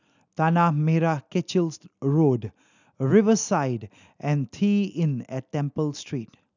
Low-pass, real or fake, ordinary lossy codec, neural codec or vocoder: 7.2 kHz; real; none; none